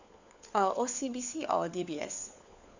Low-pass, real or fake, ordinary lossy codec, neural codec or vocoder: 7.2 kHz; fake; none; codec, 16 kHz, 4 kbps, FunCodec, trained on LibriTTS, 50 frames a second